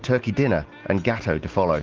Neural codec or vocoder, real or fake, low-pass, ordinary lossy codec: none; real; 7.2 kHz; Opus, 16 kbps